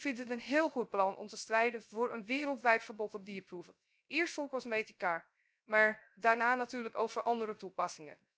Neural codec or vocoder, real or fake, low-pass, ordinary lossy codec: codec, 16 kHz, 0.3 kbps, FocalCodec; fake; none; none